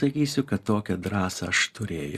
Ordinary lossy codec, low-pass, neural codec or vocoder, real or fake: Opus, 64 kbps; 14.4 kHz; none; real